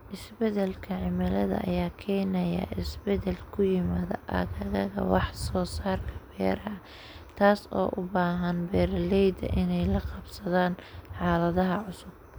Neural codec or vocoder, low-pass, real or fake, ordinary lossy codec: none; none; real; none